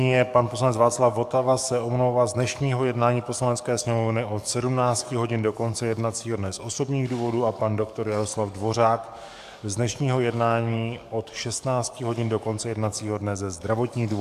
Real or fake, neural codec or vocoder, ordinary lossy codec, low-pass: fake; codec, 44.1 kHz, 7.8 kbps, DAC; AAC, 96 kbps; 14.4 kHz